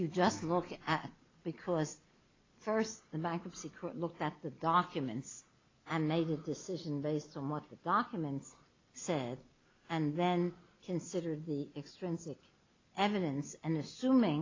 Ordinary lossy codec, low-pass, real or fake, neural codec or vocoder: AAC, 32 kbps; 7.2 kHz; real; none